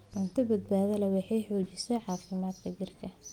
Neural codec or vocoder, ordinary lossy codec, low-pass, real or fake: none; Opus, 32 kbps; 19.8 kHz; real